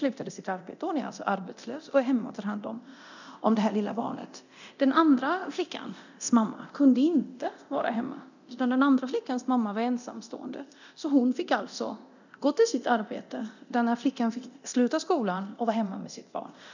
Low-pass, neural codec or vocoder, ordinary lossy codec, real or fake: 7.2 kHz; codec, 24 kHz, 0.9 kbps, DualCodec; none; fake